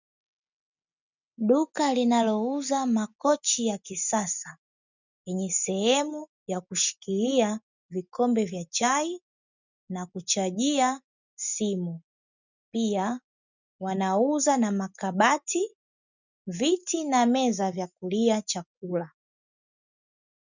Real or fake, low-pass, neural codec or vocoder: real; 7.2 kHz; none